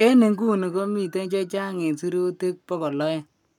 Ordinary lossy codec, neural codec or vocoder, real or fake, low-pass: none; codec, 44.1 kHz, 7.8 kbps, Pupu-Codec; fake; 19.8 kHz